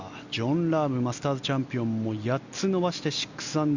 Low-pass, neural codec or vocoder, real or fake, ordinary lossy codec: 7.2 kHz; none; real; Opus, 64 kbps